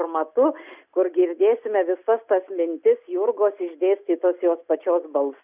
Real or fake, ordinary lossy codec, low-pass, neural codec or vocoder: real; Opus, 64 kbps; 3.6 kHz; none